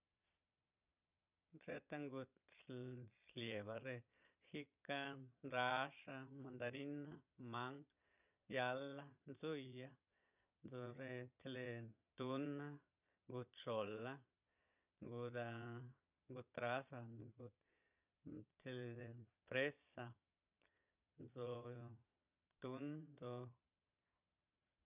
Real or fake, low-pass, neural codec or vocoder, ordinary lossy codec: fake; 3.6 kHz; vocoder, 22.05 kHz, 80 mel bands, Vocos; none